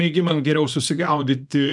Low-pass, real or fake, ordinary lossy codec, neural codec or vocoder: 10.8 kHz; fake; MP3, 96 kbps; codec, 24 kHz, 0.9 kbps, WavTokenizer, small release